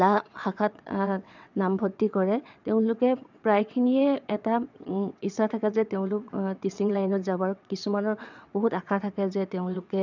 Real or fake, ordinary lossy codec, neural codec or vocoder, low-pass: fake; none; vocoder, 22.05 kHz, 80 mel bands, WaveNeXt; 7.2 kHz